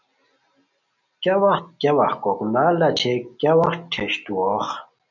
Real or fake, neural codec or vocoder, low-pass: real; none; 7.2 kHz